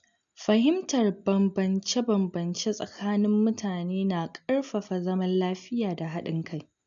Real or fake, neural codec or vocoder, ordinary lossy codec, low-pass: real; none; none; 7.2 kHz